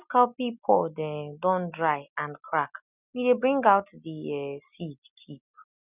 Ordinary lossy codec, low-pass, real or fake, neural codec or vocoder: none; 3.6 kHz; real; none